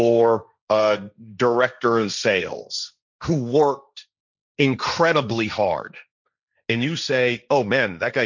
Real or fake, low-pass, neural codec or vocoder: fake; 7.2 kHz; codec, 16 kHz, 1.1 kbps, Voila-Tokenizer